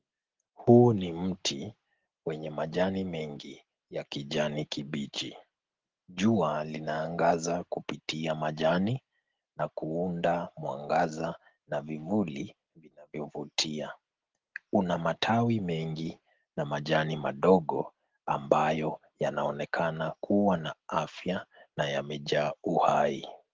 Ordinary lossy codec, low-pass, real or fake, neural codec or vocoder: Opus, 16 kbps; 7.2 kHz; real; none